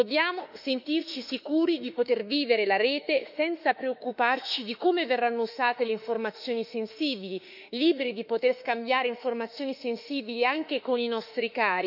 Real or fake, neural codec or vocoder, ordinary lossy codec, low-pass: fake; autoencoder, 48 kHz, 32 numbers a frame, DAC-VAE, trained on Japanese speech; none; 5.4 kHz